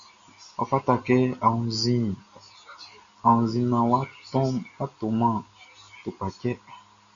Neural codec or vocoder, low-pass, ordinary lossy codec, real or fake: none; 7.2 kHz; Opus, 64 kbps; real